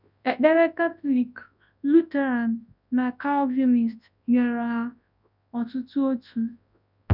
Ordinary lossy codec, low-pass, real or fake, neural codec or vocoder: none; 5.4 kHz; fake; codec, 24 kHz, 0.9 kbps, WavTokenizer, large speech release